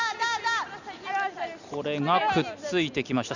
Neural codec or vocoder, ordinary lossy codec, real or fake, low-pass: none; none; real; 7.2 kHz